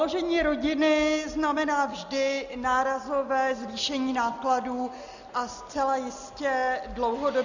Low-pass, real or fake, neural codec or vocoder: 7.2 kHz; real; none